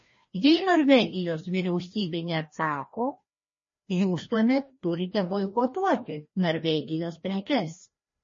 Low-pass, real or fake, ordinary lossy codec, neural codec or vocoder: 7.2 kHz; fake; MP3, 32 kbps; codec, 16 kHz, 1 kbps, FreqCodec, larger model